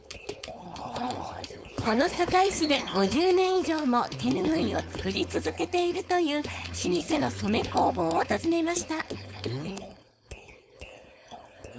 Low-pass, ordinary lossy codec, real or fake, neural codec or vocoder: none; none; fake; codec, 16 kHz, 4.8 kbps, FACodec